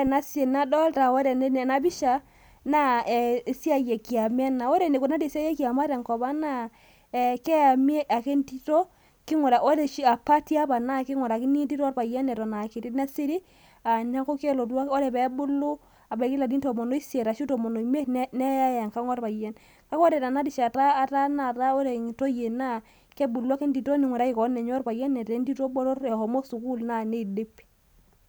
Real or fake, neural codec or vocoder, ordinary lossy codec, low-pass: real; none; none; none